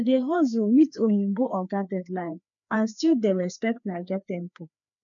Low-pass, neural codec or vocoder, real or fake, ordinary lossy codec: 7.2 kHz; codec, 16 kHz, 2 kbps, FreqCodec, larger model; fake; none